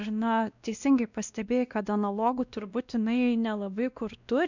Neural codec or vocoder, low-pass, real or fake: codec, 16 kHz, 1 kbps, X-Codec, WavLM features, trained on Multilingual LibriSpeech; 7.2 kHz; fake